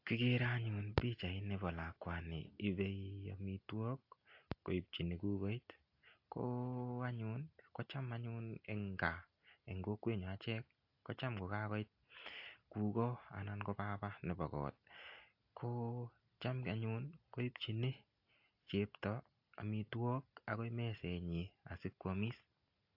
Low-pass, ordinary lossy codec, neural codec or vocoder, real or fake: 5.4 kHz; AAC, 48 kbps; none; real